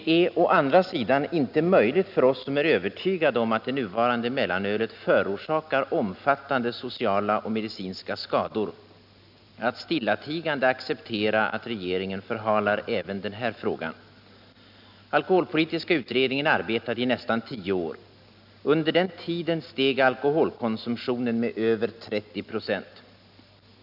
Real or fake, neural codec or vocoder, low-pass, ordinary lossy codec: real; none; 5.4 kHz; none